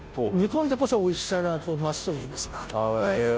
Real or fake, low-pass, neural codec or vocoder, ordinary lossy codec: fake; none; codec, 16 kHz, 0.5 kbps, FunCodec, trained on Chinese and English, 25 frames a second; none